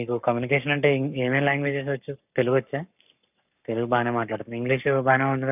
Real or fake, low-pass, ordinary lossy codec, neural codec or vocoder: fake; 3.6 kHz; none; codec, 44.1 kHz, 7.8 kbps, Pupu-Codec